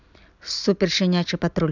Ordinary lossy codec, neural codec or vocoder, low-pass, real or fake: none; none; 7.2 kHz; real